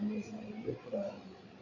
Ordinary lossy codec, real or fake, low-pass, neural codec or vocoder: MP3, 32 kbps; fake; 7.2 kHz; codec, 16 kHz, 8 kbps, FreqCodec, larger model